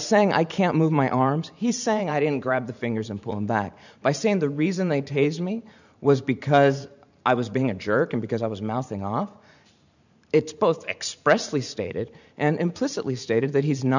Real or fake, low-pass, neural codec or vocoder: fake; 7.2 kHz; vocoder, 44.1 kHz, 128 mel bands every 512 samples, BigVGAN v2